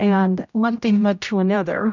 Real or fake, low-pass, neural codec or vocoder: fake; 7.2 kHz; codec, 16 kHz, 0.5 kbps, X-Codec, HuBERT features, trained on general audio